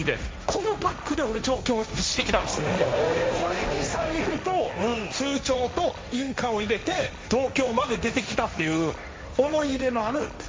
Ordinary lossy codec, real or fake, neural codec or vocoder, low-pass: none; fake; codec, 16 kHz, 1.1 kbps, Voila-Tokenizer; none